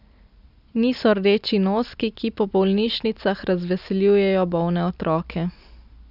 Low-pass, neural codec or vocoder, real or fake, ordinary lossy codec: 5.4 kHz; none; real; none